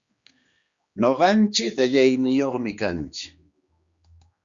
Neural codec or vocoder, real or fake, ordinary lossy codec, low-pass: codec, 16 kHz, 2 kbps, X-Codec, HuBERT features, trained on general audio; fake; Opus, 64 kbps; 7.2 kHz